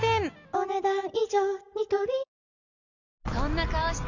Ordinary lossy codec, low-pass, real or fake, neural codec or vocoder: none; 7.2 kHz; real; none